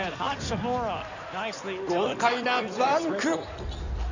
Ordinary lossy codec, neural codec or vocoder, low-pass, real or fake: none; codec, 16 kHz in and 24 kHz out, 2.2 kbps, FireRedTTS-2 codec; 7.2 kHz; fake